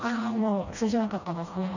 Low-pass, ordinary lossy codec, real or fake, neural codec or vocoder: 7.2 kHz; none; fake; codec, 16 kHz, 1 kbps, FreqCodec, smaller model